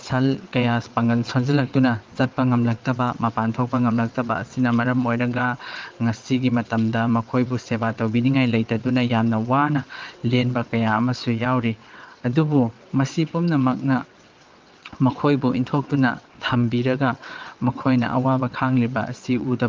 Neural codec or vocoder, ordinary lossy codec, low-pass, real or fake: vocoder, 22.05 kHz, 80 mel bands, WaveNeXt; Opus, 24 kbps; 7.2 kHz; fake